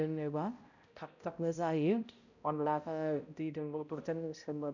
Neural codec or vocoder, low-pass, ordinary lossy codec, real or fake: codec, 16 kHz, 0.5 kbps, X-Codec, HuBERT features, trained on balanced general audio; 7.2 kHz; none; fake